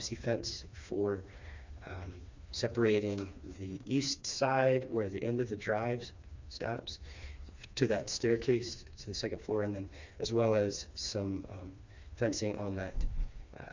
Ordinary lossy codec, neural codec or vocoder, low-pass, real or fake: MP3, 64 kbps; codec, 16 kHz, 2 kbps, FreqCodec, smaller model; 7.2 kHz; fake